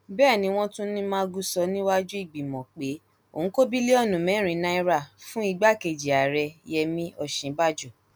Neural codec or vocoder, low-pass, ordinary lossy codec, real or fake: none; none; none; real